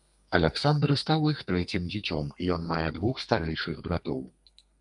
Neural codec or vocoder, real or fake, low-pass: codec, 32 kHz, 1.9 kbps, SNAC; fake; 10.8 kHz